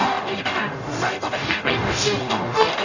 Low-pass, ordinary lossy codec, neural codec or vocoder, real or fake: 7.2 kHz; none; codec, 44.1 kHz, 0.9 kbps, DAC; fake